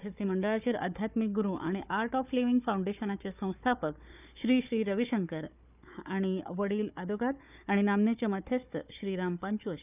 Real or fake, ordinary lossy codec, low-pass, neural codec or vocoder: fake; none; 3.6 kHz; codec, 16 kHz, 4 kbps, FunCodec, trained on Chinese and English, 50 frames a second